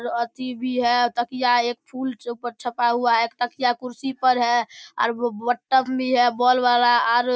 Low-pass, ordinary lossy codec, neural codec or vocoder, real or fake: none; none; none; real